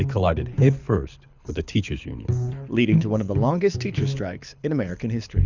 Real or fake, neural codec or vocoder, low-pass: fake; codec, 24 kHz, 6 kbps, HILCodec; 7.2 kHz